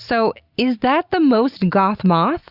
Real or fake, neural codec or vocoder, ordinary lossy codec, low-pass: real; none; Opus, 64 kbps; 5.4 kHz